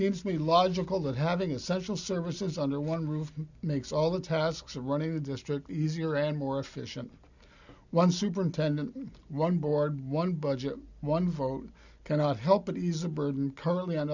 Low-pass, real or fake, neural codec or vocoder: 7.2 kHz; real; none